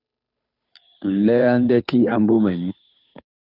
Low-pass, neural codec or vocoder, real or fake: 5.4 kHz; codec, 16 kHz, 2 kbps, FunCodec, trained on Chinese and English, 25 frames a second; fake